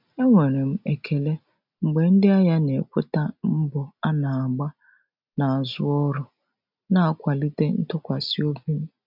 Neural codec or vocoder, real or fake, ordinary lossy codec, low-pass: none; real; none; 5.4 kHz